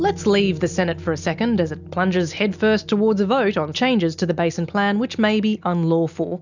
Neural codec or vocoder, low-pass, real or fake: none; 7.2 kHz; real